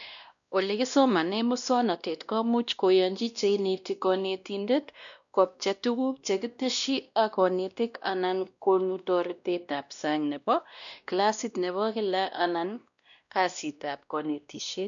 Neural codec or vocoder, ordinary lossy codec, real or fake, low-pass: codec, 16 kHz, 1 kbps, X-Codec, WavLM features, trained on Multilingual LibriSpeech; none; fake; 7.2 kHz